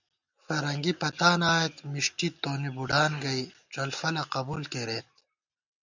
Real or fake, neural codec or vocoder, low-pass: real; none; 7.2 kHz